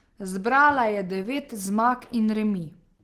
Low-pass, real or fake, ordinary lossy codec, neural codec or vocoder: 14.4 kHz; real; Opus, 16 kbps; none